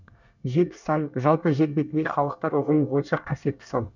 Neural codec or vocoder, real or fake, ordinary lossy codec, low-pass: codec, 24 kHz, 1 kbps, SNAC; fake; none; 7.2 kHz